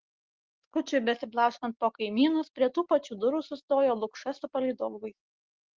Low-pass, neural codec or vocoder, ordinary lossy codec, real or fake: 7.2 kHz; none; Opus, 16 kbps; real